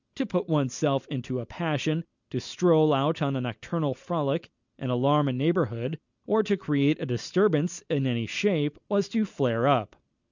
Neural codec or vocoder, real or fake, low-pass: none; real; 7.2 kHz